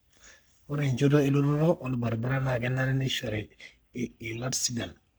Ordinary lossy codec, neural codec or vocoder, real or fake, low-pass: none; codec, 44.1 kHz, 3.4 kbps, Pupu-Codec; fake; none